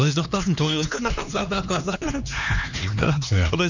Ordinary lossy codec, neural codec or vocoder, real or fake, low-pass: none; codec, 16 kHz, 2 kbps, X-Codec, HuBERT features, trained on LibriSpeech; fake; 7.2 kHz